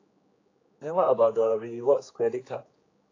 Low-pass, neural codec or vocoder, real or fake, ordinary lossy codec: 7.2 kHz; codec, 16 kHz, 4 kbps, X-Codec, HuBERT features, trained on general audio; fake; AAC, 32 kbps